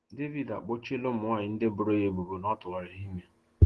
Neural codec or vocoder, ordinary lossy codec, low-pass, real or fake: none; Opus, 16 kbps; 10.8 kHz; real